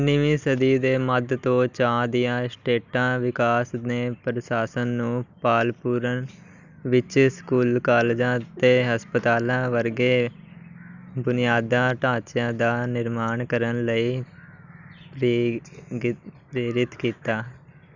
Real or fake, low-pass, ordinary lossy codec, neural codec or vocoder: real; 7.2 kHz; none; none